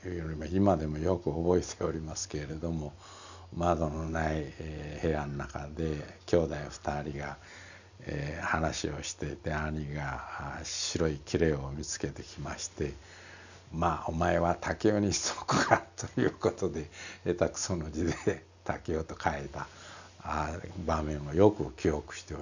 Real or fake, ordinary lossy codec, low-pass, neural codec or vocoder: real; none; 7.2 kHz; none